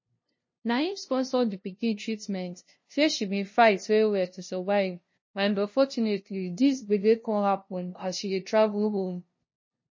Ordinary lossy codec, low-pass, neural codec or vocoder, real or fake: MP3, 32 kbps; 7.2 kHz; codec, 16 kHz, 0.5 kbps, FunCodec, trained on LibriTTS, 25 frames a second; fake